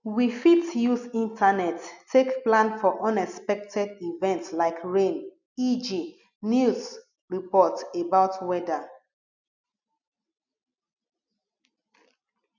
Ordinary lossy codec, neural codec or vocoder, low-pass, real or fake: none; none; 7.2 kHz; real